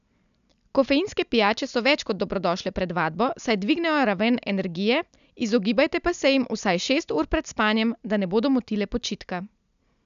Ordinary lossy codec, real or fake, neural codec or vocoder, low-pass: none; real; none; 7.2 kHz